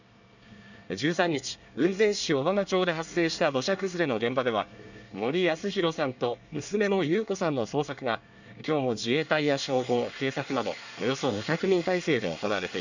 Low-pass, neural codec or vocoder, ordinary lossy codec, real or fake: 7.2 kHz; codec, 24 kHz, 1 kbps, SNAC; none; fake